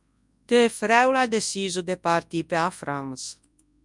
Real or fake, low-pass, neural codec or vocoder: fake; 10.8 kHz; codec, 24 kHz, 0.9 kbps, WavTokenizer, large speech release